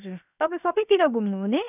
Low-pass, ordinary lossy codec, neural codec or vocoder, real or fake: 3.6 kHz; none; codec, 16 kHz, 0.7 kbps, FocalCodec; fake